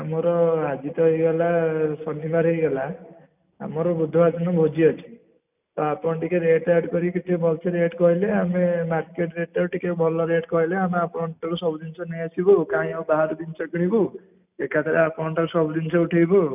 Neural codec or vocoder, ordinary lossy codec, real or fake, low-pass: none; none; real; 3.6 kHz